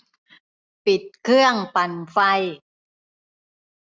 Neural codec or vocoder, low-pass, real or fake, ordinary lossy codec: none; none; real; none